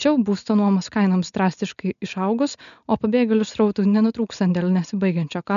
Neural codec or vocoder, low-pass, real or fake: none; 7.2 kHz; real